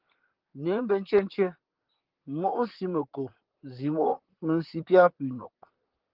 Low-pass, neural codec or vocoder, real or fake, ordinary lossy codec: 5.4 kHz; vocoder, 44.1 kHz, 128 mel bands, Pupu-Vocoder; fake; Opus, 16 kbps